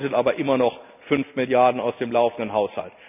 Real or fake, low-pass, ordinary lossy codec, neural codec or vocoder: real; 3.6 kHz; none; none